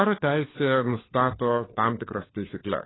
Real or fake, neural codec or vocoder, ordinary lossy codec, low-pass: fake; codec, 16 kHz, 4 kbps, FunCodec, trained on LibriTTS, 50 frames a second; AAC, 16 kbps; 7.2 kHz